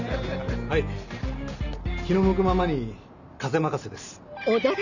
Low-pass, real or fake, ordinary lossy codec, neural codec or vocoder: 7.2 kHz; real; none; none